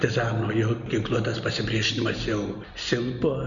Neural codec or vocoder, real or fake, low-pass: none; real; 7.2 kHz